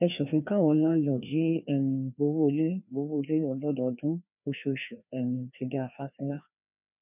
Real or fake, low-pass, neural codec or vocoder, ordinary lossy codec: fake; 3.6 kHz; codec, 16 kHz, 2 kbps, FreqCodec, larger model; none